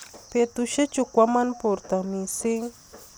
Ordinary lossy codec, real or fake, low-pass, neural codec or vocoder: none; real; none; none